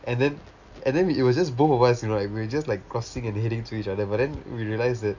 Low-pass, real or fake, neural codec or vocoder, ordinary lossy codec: 7.2 kHz; real; none; none